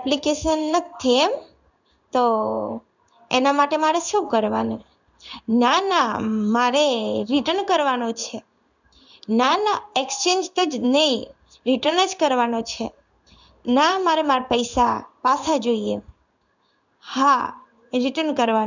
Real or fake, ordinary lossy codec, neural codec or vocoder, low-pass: fake; none; codec, 16 kHz in and 24 kHz out, 1 kbps, XY-Tokenizer; 7.2 kHz